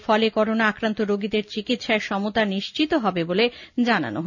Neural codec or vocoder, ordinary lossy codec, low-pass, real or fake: none; none; 7.2 kHz; real